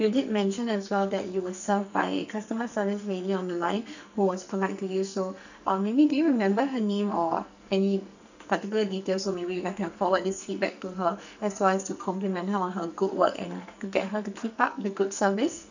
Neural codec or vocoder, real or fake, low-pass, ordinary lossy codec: codec, 44.1 kHz, 2.6 kbps, SNAC; fake; 7.2 kHz; none